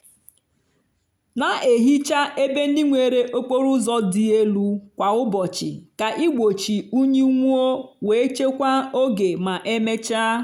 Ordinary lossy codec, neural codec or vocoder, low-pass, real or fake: none; none; 19.8 kHz; real